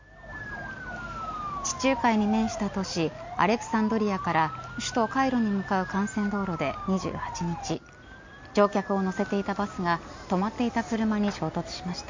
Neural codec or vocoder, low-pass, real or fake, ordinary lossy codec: none; 7.2 kHz; real; MP3, 48 kbps